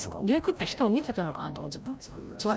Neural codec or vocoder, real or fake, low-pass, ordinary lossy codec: codec, 16 kHz, 0.5 kbps, FreqCodec, larger model; fake; none; none